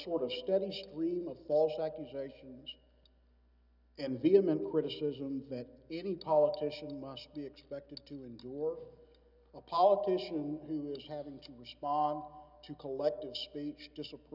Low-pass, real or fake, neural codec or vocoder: 5.4 kHz; real; none